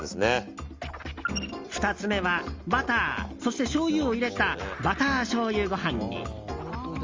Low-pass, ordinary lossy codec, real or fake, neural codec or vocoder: 7.2 kHz; Opus, 32 kbps; real; none